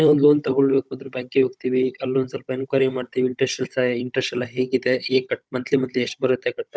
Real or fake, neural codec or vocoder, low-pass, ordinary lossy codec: fake; codec, 16 kHz, 16 kbps, FunCodec, trained on Chinese and English, 50 frames a second; none; none